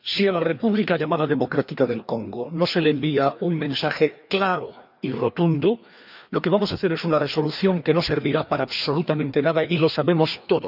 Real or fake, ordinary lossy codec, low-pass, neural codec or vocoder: fake; none; 5.4 kHz; codec, 16 kHz, 2 kbps, FreqCodec, larger model